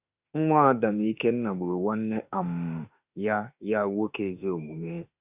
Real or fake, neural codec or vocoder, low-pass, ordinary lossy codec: fake; autoencoder, 48 kHz, 32 numbers a frame, DAC-VAE, trained on Japanese speech; 3.6 kHz; Opus, 64 kbps